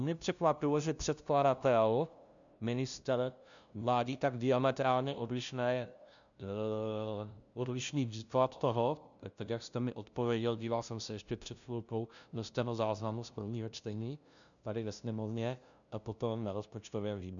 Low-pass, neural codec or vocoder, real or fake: 7.2 kHz; codec, 16 kHz, 0.5 kbps, FunCodec, trained on LibriTTS, 25 frames a second; fake